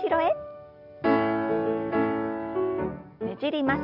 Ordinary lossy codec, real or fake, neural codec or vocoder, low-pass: Opus, 64 kbps; real; none; 5.4 kHz